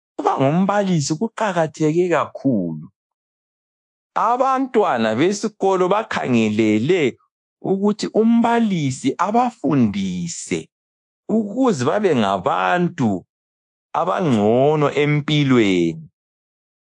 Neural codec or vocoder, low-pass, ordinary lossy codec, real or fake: codec, 24 kHz, 1.2 kbps, DualCodec; 10.8 kHz; AAC, 64 kbps; fake